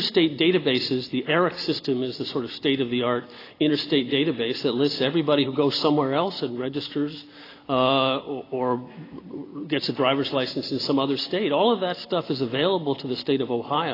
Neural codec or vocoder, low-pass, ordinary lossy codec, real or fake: none; 5.4 kHz; AAC, 24 kbps; real